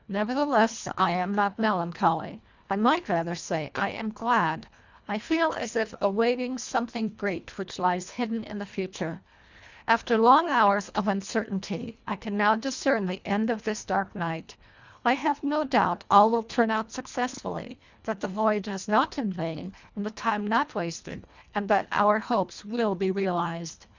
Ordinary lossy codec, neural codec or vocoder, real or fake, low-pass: Opus, 64 kbps; codec, 24 kHz, 1.5 kbps, HILCodec; fake; 7.2 kHz